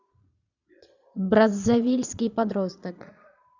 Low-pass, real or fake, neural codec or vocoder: 7.2 kHz; fake; vocoder, 22.05 kHz, 80 mel bands, Vocos